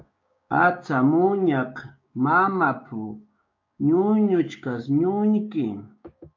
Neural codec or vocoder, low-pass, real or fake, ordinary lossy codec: codec, 16 kHz, 6 kbps, DAC; 7.2 kHz; fake; MP3, 48 kbps